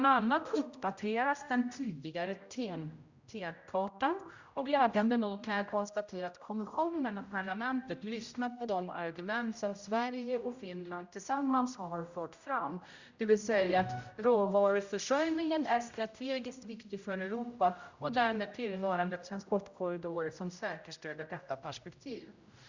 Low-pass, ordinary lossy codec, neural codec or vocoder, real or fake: 7.2 kHz; Opus, 64 kbps; codec, 16 kHz, 0.5 kbps, X-Codec, HuBERT features, trained on general audio; fake